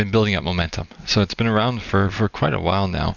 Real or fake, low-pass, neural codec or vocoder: real; 7.2 kHz; none